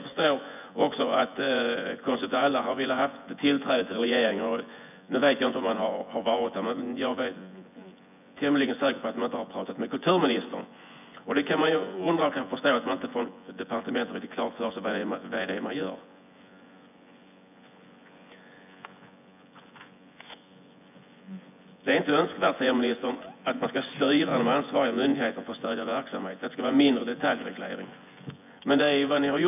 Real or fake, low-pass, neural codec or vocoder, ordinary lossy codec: fake; 3.6 kHz; vocoder, 24 kHz, 100 mel bands, Vocos; none